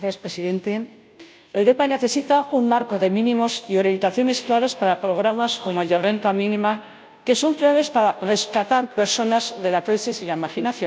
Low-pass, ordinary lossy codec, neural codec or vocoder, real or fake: none; none; codec, 16 kHz, 0.5 kbps, FunCodec, trained on Chinese and English, 25 frames a second; fake